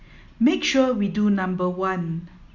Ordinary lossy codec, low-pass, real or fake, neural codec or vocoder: none; 7.2 kHz; real; none